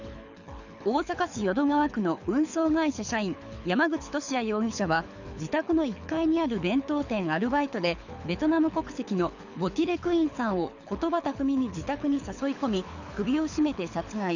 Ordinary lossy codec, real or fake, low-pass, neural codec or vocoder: none; fake; 7.2 kHz; codec, 24 kHz, 6 kbps, HILCodec